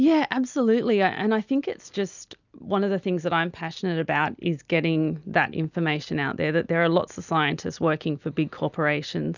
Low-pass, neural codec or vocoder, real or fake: 7.2 kHz; none; real